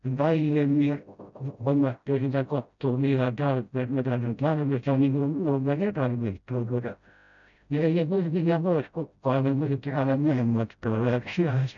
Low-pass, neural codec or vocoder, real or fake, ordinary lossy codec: 7.2 kHz; codec, 16 kHz, 0.5 kbps, FreqCodec, smaller model; fake; none